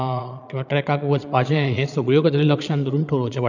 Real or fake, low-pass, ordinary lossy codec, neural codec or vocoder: fake; 7.2 kHz; none; vocoder, 22.05 kHz, 80 mel bands, Vocos